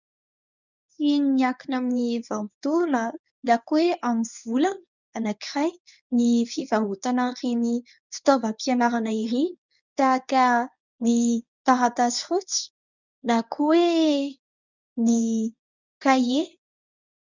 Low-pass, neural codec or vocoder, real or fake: 7.2 kHz; codec, 24 kHz, 0.9 kbps, WavTokenizer, medium speech release version 1; fake